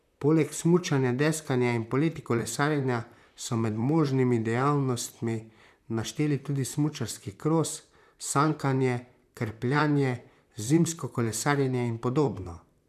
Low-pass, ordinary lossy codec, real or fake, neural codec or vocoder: 14.4 kHz; none; fake; vocoder, 44.1 kHz, 128 mel bands, Pupu-Vocoder